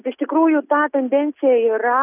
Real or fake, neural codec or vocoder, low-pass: real; none; 3.6 kHz